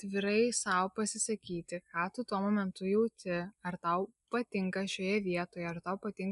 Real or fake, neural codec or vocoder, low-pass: real; none; 10.8 kHz